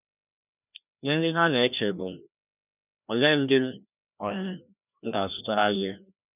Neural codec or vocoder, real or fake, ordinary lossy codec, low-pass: codec, 16 kHz, 1 kbps, FreqCodec, larger model; fake; none; 3.6 kHz